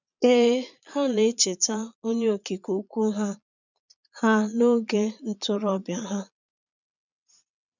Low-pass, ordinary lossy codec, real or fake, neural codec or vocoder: 7.2 kHz; none; fake; vocoder, 22.05 kHz, 80 mel bands, Vocos